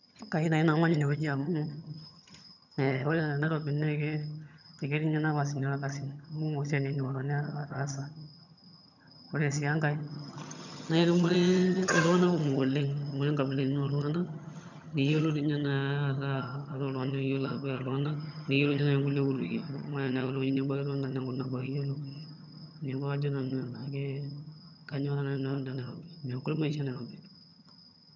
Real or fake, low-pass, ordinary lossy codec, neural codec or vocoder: fake; 7.2 kHz; none; vocoder, 22.05 kHz, 80 mel bands, HiFi-GAN